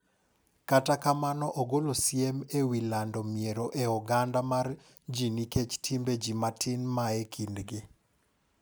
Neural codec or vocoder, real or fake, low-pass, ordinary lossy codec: none; real; none; none